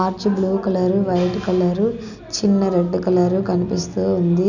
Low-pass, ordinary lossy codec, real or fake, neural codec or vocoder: 7.2 kHz; none; real; none